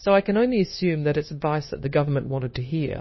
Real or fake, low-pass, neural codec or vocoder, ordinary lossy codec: fake; 7.2 kHz; codec, 16 kHz, 0.9 kbps, LongCat-Audio-Codec; MP3, 24 kbps